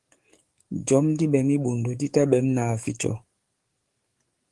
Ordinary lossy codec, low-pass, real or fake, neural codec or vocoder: Opus, 24 kbps; 10.8 kHz; fake; codec, 44.1 kHz, 7.8 kbps, DAC